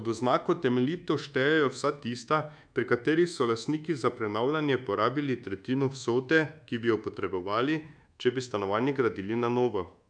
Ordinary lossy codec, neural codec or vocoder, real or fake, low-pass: none; codec, 24 kHz, 1.2 kbps, DualCodec; fake; 9.9 kHz